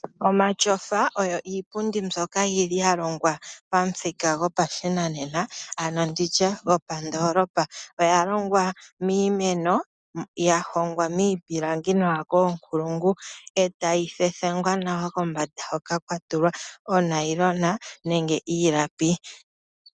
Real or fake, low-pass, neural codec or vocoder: real; 9.9 kHz; none